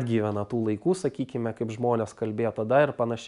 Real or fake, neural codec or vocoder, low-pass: real; none; 10.8 kHz